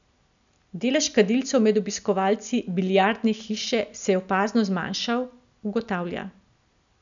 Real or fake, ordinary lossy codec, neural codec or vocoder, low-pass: real; none; none; 7.2 kHz